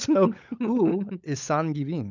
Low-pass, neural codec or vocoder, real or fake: 7.2 kHz; codec, 16 kHz, 16 kbps, FunCodec, trained on LibriTTS, 50 frames a second; fake